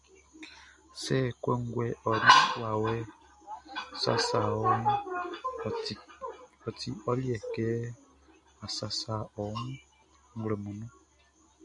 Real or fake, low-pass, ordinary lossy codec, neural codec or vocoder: real; 10.8 kHz; MP3, 96 kbps; none